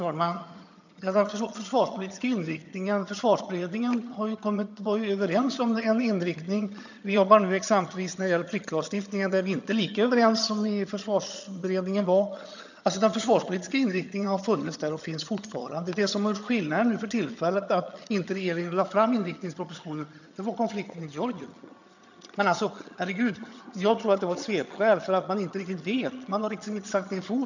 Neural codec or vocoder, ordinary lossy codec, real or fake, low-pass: vocoder, 22.05 kHz, 80 mel bands, HiFi-GAN; none; fake; 7.2 kHz